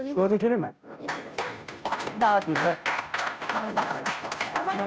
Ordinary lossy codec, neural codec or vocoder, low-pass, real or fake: none; codec, 16 kHz, 0.5 kbps, FunCodec, trained on Chinese and English, 25 frames a second; none; fake